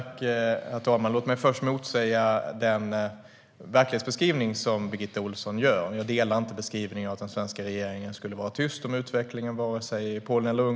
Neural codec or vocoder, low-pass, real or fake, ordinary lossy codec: none; none; real; none